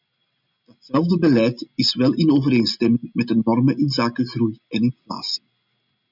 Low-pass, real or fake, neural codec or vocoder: 5.4 kHz; real; none